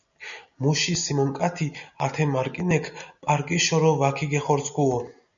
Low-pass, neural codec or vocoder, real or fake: 7.2 kHz; none; real